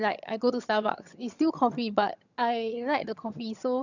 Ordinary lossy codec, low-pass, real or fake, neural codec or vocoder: none; 7.2 kHz; fake; vocoder, 22.05 kHz, 80 mel bands, HiFi-GAN